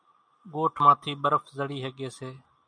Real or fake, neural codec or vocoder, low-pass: real; none; 9.9 kHz